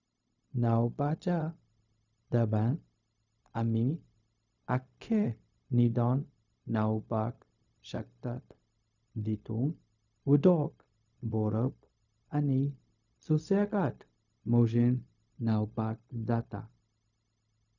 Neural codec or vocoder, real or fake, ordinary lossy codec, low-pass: codec, 16 kHz, 0.4 kbps, LongCat-Audio-Codec; fake; none; 7.2 kHz